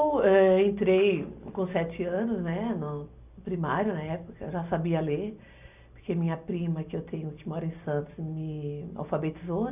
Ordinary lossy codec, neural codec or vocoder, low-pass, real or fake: none; none; 3.6 kHz; real